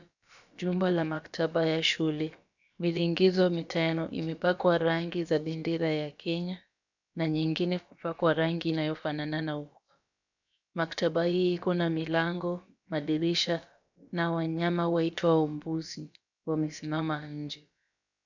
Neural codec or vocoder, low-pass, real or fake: codec, 16 kHz, about 1 kbps, DyCAST, with the encoder's durations; 7.2 kHz; fake